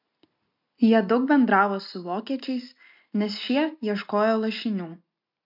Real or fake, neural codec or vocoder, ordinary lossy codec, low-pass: real; none; MP3, 48 kbps; 5.4 kHz